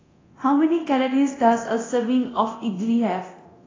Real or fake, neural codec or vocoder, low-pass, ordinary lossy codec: fake; codec, 24 kHz, 0.9 kbps, DualCodec; 7.2 kHz; AAC, 32 kbps